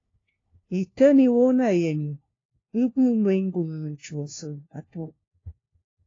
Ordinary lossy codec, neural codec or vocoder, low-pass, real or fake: AAC, 32 kbps; codec, 16 kHz, 1 kbps, FunCodec, trained on LibriTTS, 50 frames a second; 7.2 kHz; fake